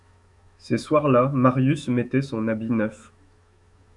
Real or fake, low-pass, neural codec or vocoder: fake; 10.8 kHz; autoencoder, 48 kHz, 128 numbers a frame, DAC-VAE, trained on Japanese speech